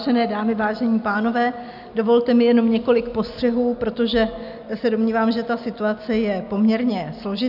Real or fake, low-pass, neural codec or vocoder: real; 5.4 kHz; none